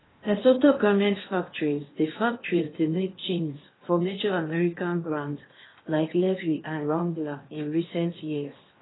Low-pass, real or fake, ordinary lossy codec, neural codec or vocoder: 7.2 kHz; fake; AAC, 16 kbps; codec, 16 kHz in and 24 kHz out, 0.8 kbps, FocalCodec, streaming, 65536 codes